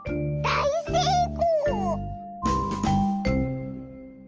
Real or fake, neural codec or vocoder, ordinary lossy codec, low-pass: real; none; Opus, 24 kbps; 7.2 kHz